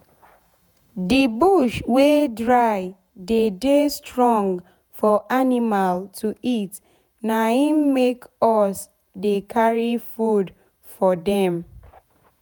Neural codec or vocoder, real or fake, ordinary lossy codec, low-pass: vocoder, 48 kHz, 128 mel bands, Vocos; fake; none; none